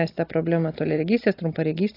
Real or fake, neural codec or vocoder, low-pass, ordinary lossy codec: real; none; 5.4 kHz; AAC, 24 kbps